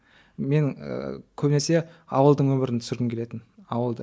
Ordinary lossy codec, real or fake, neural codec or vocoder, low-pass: none; real; none; none